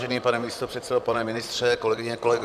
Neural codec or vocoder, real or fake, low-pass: vocoder, 44.1 kHz, 128 mel bands, Pupu-Vocoder; fake; 14.4 kHz